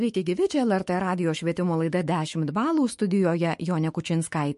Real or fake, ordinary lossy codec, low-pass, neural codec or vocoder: fake; MP3, 48 kbps; 14.4 kHz; autoencoder, 48 kHz, 128 numbers a frame, DAC-VAE, trained on Japanese speech